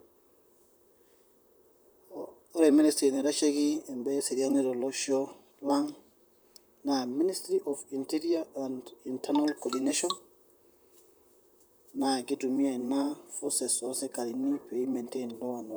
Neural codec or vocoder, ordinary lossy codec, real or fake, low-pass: vocoder, 44.1 kHz, 128 mel bands, Pupu-Vocoder; none; fake; none